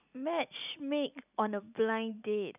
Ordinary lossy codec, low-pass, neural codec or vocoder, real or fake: none; 3.6 kHz; none; real